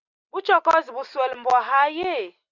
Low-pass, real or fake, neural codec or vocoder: 7.2 kHz; real; none